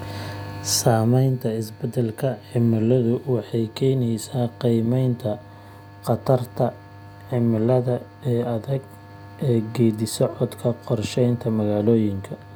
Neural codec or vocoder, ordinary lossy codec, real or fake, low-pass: none; none; real; none